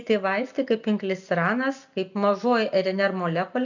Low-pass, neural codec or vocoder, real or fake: 7.2 kHz; none; real